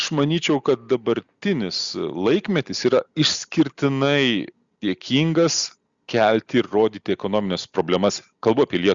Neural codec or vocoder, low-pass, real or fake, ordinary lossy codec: none; 7.2 kHz; real; Opus, 64 kbps